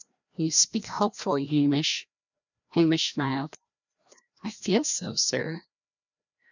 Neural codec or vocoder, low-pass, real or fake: codec, 16 kHz, 1 kbps, FreqCodec, larger model; 7.2 kHz; fake